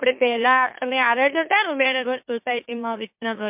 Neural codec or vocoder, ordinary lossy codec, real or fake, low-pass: autoencoder, 44.1 kHz, a latent of 192 numbers a frame, MeloTTS; MP3, 32 kbps; fake; 3.6 kHz